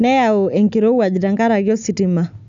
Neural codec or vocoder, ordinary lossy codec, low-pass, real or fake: none; none; 7.2 kHz; real